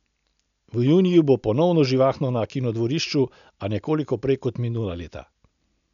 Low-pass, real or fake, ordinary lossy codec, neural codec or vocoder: 7.2 kHz; real; none; none